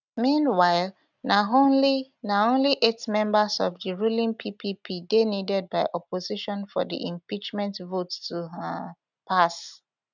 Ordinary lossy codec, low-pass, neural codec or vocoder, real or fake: none; 7.2 kHz; none; real